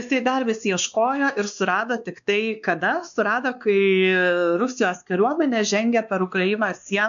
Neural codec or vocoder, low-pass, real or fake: codec, 16 kHz, 2 kbps, X-Codec, WavLM features, trained on Multilingual LibriSpeech; 7.2 kHz; fake